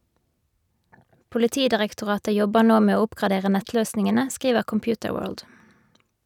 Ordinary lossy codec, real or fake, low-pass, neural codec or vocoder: none; real; 19.8 kHz; none